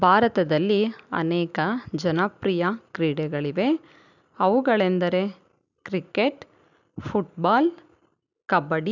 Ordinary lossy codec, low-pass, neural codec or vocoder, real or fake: none; 7.2 kHz; none; real